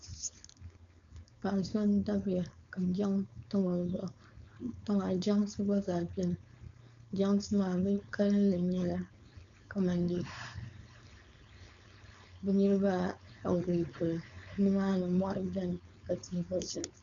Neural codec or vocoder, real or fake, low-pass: codec, 16 kHz, 4.8 kbps, FACodec; fake; 7.2 kHz